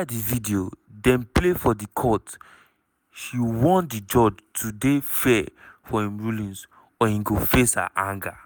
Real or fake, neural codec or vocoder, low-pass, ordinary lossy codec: real; none; none; none